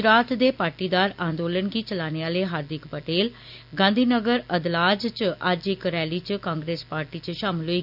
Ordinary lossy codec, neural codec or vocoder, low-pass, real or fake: none; none; 5.4 kHz; real